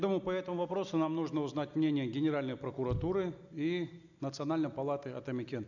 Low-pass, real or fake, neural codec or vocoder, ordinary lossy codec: 7.2 kHz; real; none; none